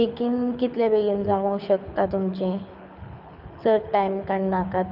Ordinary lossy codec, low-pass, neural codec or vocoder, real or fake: none; 5.4 kHz; codec, 24 kHz, 6 kbps, HILCodec; fake